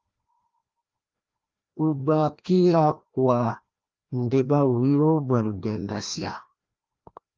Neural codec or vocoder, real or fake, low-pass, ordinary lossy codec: codec, 16 kHz, 1 kbps, FreqCodec, larger model; fake; 7.2 kHz; Opus, 24 kbps